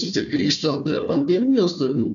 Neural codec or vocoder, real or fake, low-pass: codec, 16 kHz, 1 kbps, FunCodec, trained on Chinese and English, 50 frames a second; fake; 7.2 kHz